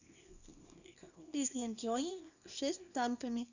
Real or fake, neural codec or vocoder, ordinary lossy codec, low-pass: fake; codec, 24 kHz, 0.9 kbps, WavTokenizer, small release; none; 7.2 kHz